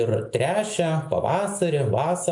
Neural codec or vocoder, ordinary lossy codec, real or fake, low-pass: none; AAC, 64 kbps; real; 10.8 kHz